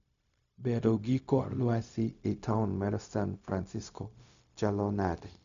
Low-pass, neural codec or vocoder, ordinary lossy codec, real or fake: 7.2 kHz; codec, 16 kHz, 0.4 kbps, LongCat-Audio-Codec; none; fake